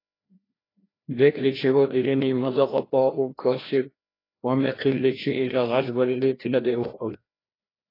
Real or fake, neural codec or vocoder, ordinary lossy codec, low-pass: fake; codec, 16 kHz, 1 kbps, FreqCodec, larger model; AAC, 24 kbps; 5.4 kHz